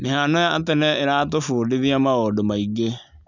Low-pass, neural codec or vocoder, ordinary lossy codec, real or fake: 7.2 kHz; none; none; real